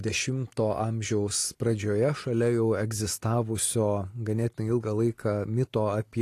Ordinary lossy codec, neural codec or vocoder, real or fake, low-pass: AAC, 48 kbps; none; real; 14.4 kHz